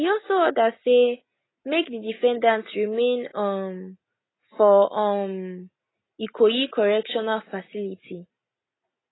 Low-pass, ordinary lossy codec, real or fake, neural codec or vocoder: 7.2 kHz; AAC, 16 kbps; real; none